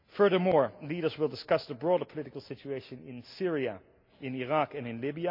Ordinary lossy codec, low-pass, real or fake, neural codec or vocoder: MP3, 48 kbps; 5.4 kHz; real; none